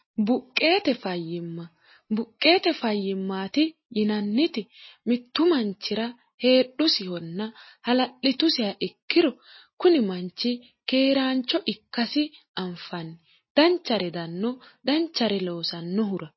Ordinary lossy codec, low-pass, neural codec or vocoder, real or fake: MP3, 24 kbps; 7.2 kHz; none; real